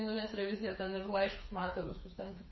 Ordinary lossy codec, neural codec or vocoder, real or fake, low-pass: MP3, 24 kbps; codec, 16 kHz, 4 kbps, FreqCodec, smaller model; fake; 7.2 kHz